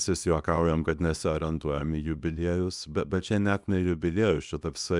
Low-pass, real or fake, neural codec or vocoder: 10.8 kHz; fake; codec, 24 kHz, 0.9 kbps, WavTokenizer, small release